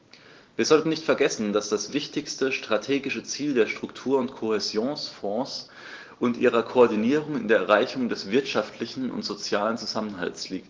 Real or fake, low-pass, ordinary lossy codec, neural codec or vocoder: real; 7.2 kHz; Opus, 16 kbps; none